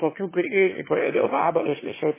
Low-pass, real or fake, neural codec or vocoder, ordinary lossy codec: 3.6 kHz; fake; autoencoder, 22.05 kHz, a latent of 192 numbers a frame, VITS, trained on one speaker; MP3, 16 kbps